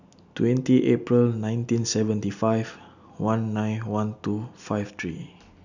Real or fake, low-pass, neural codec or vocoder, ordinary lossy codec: real; 7.2 kHz; none; none